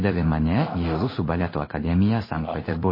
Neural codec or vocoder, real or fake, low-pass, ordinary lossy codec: codec, 16 kHz in and 24 kHz out, 1 kbps, XY-Tokenizer; fake; 5.4 kHz; MP3, 24 kbps